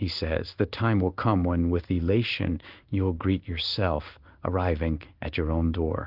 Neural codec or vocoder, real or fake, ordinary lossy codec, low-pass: codec, 16 kHz in and 24 kHz out, 1 kbps, XY-Tokenizer; fake; Opus, 32 kbps; 5.4 kHz